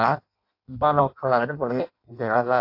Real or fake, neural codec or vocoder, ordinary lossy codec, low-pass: fake; codec, 16 kHz in and 24 kHz out, 0.6 kbps, FireRedTTS-2 codec; AAC, 32 kbps; 5.4 kHz